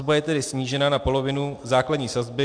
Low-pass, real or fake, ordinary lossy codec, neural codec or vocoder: 9.9 kHz; real; AAC, 64 kbps; none